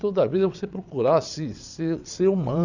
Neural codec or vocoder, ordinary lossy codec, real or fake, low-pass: vocoder, 22.05 kHz, 80 mel bands, WaveNeXt; none; fake; 7.2 kHz